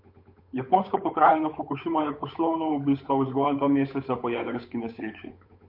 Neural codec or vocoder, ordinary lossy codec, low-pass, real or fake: codec, 16 kHz, 8 kbps, FunCodec, trained on Chinese and English, 25 frames a second; MP3, 32 kbps; 5.4 kHz; fake